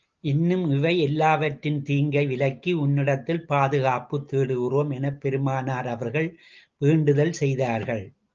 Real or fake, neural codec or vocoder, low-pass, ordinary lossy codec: real; none; 7.2 kHz; Opus, 24 kbps